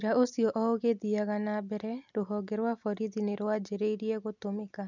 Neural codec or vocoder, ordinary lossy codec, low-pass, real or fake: none; none; 7.2 kHz; real